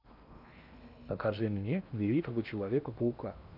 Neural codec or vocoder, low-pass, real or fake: codec, 16 kHz in and 24 kHz out, 0.8 kbps, FocalCodec, streaming, 65536 codes; 5.4 kHz; fake